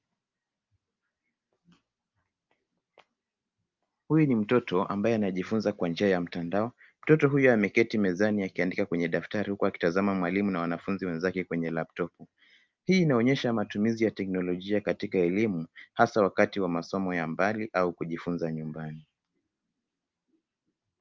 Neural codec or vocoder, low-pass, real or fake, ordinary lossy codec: none; 7.2 kHz; real; Opus, 24 kbps